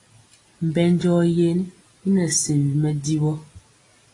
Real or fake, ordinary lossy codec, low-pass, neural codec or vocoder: real; AAC, 32 kbps; 10.8 kHz; none